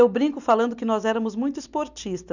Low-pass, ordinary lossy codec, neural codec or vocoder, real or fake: 7.2 kHz; none; none; real